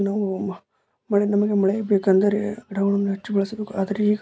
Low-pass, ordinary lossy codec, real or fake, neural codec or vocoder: none; none; real; none